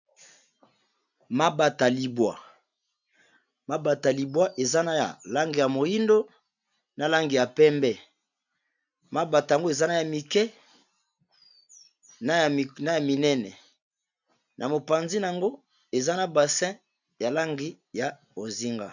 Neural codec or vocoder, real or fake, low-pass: none; real; 7.2 kHz